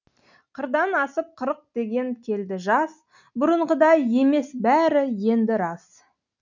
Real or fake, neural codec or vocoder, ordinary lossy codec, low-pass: real; none; none; 7.2 kHz